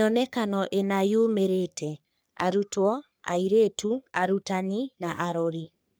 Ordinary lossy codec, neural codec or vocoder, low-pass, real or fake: none; codec, 44.1 kHz, 3.4 kbps, Pupu-Codec; none; fake